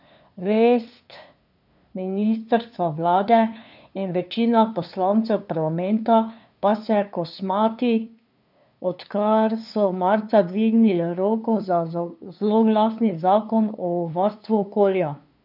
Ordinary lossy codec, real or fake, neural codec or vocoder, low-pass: none; fake; codec, 16 kHz, 2 kbps, FunCodec, trained on LibriTTS, 25 frames a second; 5.4 kHz